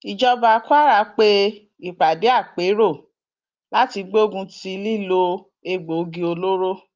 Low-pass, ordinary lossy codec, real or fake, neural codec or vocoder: 7.2 kHz; Opus, 24 kbps; real; none